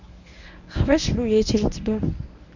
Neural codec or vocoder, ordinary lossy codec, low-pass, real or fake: codec, 24 kHz, 0.9 kbps, WavTokenizer, medium speech release version 1; none; 7.2 kHz; fake